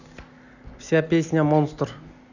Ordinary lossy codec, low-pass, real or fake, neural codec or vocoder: none; 7.2 kHz; real; none